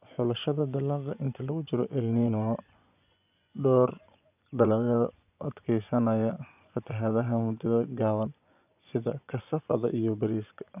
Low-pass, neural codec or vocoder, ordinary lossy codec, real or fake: 3.6 kHz; vocoder, 24 kHz, 100 mel bands, Vocos; none; fake